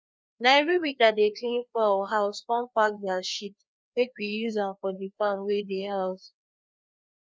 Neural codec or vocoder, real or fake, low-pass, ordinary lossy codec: codec, 16 kHz, 2 kbps, FreqCodec, larger model; fake; none; none